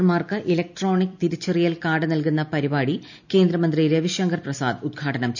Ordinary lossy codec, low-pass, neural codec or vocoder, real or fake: none; 7.2 kHz; none; real